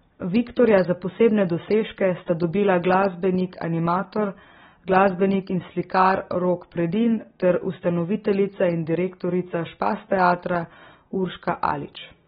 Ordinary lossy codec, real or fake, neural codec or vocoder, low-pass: AAC, 16 kbps; real; none; 14.4 kHz